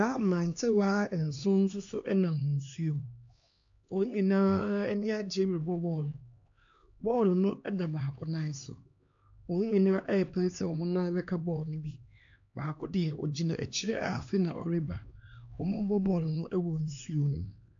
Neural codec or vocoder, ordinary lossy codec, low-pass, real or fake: codec, 16 kHz, 2 kbps, X-Codec, HuBERT features, trained on LibriSpeech; AAC, 64 kbps; 7.2 kHz; fake